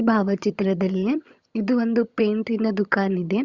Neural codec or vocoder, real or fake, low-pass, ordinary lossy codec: vocoder, 22.05 kHz, 80 mel bands, HiFi-GAN; fake; 7.2 kHz; Opus, 64 kbps